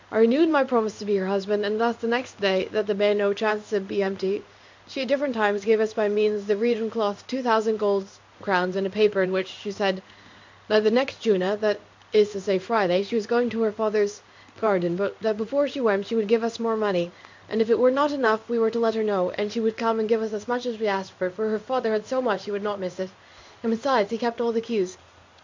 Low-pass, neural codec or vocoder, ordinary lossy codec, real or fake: 7.2 kHz; codec, 16 kHz in and 24 kHz out, 1 kbps, XY-Tokenizer; MP3, 64 kbps; fake